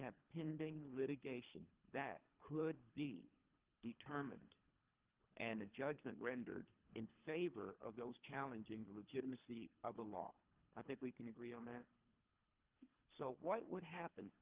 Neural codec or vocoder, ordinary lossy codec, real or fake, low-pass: codec, 24 kHz, 3 kbps, HILCodec; Opus, 32 kbps; fake; 3.6 kHz